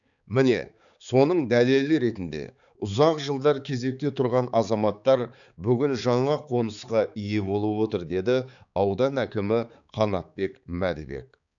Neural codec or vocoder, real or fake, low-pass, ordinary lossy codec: codec, 16 kHz, 4 kbps, X-Codec, HuBERT features, trained on balanced general audio; fake; 7.2 kHz; none